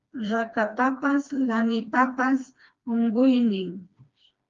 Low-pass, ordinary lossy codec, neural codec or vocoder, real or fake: 7.2 kHz; Opus, 32 kbps; codec, 16 kHz, 2 kbps, FreqCodec, smaller model; fake